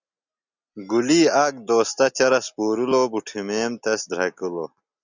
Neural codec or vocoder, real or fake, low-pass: none; real; 7.2 kHz